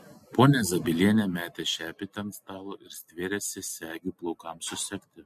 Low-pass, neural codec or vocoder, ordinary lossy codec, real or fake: 14.4 kHz; none; MP3, 64 kbps; real